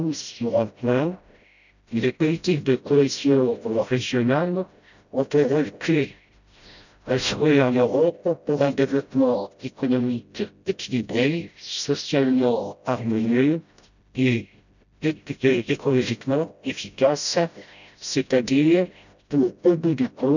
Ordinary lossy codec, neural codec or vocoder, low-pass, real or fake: none; codec, 16 kHz, 0.5 kbps, FreqCodec, smaller model; 7.2 kHz; fake